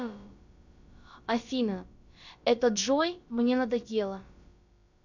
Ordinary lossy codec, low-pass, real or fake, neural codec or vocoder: none; 7.2 kHz; fake; codec, 16 kHz, about 1 kbps, DyCAST, with the encoder's durations